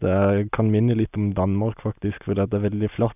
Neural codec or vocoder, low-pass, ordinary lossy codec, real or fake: none; 3.6 kHz; none; real